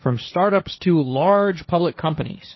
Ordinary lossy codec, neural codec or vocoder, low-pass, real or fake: MP3, 24 kbps; codec, 16 kHz, 1.1 kbps, Voila-Tokenizer; 7.2 kHz; fake